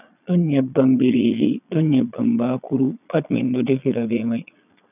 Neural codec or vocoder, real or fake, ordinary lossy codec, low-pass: vocoder, 22.05 kHz, 80 mel bands, Vocos; fake; none; 3.6 kHz